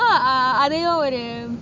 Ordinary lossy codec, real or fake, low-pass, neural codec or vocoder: none; real; 7.2 kHz; none